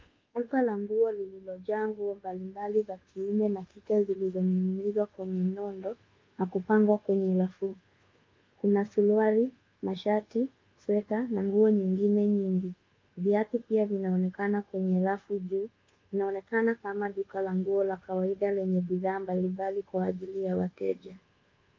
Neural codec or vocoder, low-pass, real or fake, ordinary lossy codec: codec, 24 kHz, 1.2 kbps, DualCodec; 7.2 kHz; fake; Opus, 24 kbps